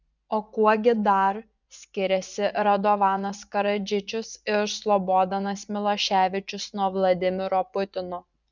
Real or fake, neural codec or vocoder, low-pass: fake; vocoder, 24 kHz, 100 mel bands, Vocos; 7.2 kHz